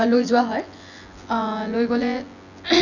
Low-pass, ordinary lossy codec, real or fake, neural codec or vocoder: 7.2 kHz; none; fake; vocoder, 24 kHz, 100 mel bands, Vocos